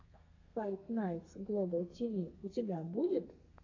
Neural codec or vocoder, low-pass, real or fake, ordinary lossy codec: codec, 32 kHz, 1.9 kbps, SNAC; 7.2 kHz; fake; AAC, 48 kbps